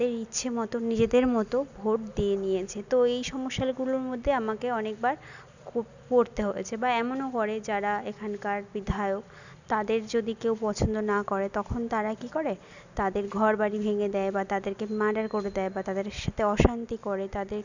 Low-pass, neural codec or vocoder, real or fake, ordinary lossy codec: 7.2 kHz; none; real; none